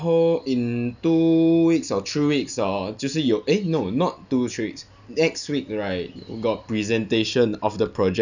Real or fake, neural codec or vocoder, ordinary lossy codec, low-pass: real; none; none; 7.2 kHz